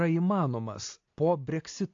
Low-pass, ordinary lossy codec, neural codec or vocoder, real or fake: 7.2 kHz; AAC, 48 kbps; none; real